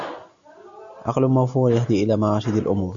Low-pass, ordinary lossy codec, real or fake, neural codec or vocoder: 7.2 kHz; MP3, 64 kbps; real; none